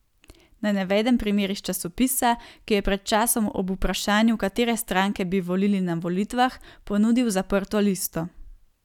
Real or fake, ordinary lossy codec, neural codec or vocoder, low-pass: real; none; none; 19.8 kHz